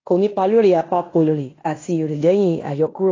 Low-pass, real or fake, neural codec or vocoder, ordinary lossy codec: 7.2 kHz; fake; codec, 16 kHz in and 24 kHz out, 0.9 kbps, LongCat-Audio-Codec, fine tuned four codebook decoder; AAC, 32 kbps